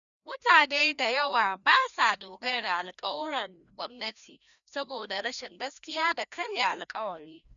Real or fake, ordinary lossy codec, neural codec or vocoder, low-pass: fake; none; codec, 16 kHz, 1 kbps, FreqCodec, larger model; 7.2 kHz